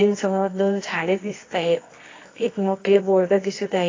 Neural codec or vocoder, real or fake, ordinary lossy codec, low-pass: codec, 24 kHz, 0.9 kbps, WavTokenizer, medium music audio release; fake; AAC, 32 kbps; 7.2 kHz